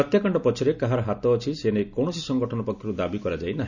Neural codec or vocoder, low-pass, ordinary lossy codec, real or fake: none; 7.2 kHz; none; real